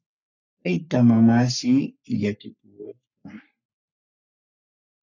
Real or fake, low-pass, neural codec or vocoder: fake; 7.2 kHz; codec, 44.1 kHz, 3.4 kbps, Pupu-Codec